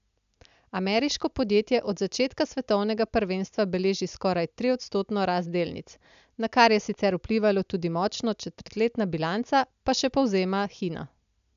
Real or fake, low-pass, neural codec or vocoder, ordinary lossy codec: real; 7.2 kHz; none; AAC, 96 kbps